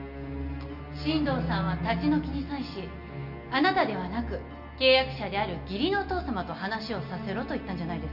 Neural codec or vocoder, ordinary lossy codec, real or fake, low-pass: none; none; real; 5.4 kHz